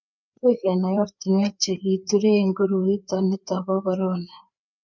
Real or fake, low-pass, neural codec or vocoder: fake; 7.2 kHz; codec, 16 kHz, 4 kbps, FreqCodec, larger model